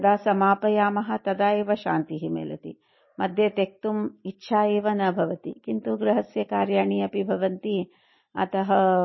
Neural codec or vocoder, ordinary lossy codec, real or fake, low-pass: none; MP3, 24 kbps; real; 7.2 kHz